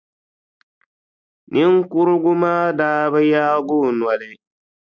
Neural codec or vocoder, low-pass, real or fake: none; 7.2 kHz; real